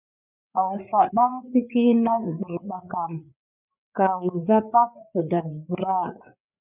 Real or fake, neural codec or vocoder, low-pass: fake; codec, 16 kHz, 4 kbps, FreqCodec, larger model; 3.6 kHz